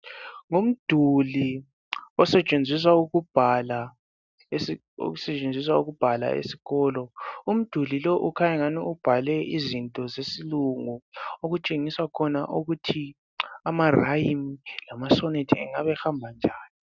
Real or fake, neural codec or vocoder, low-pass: real; none; 7.2 kHz